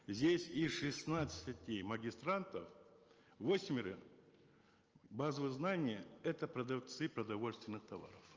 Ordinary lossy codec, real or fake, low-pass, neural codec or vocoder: Opus, 24 kbps; real; 7.2 kHz; none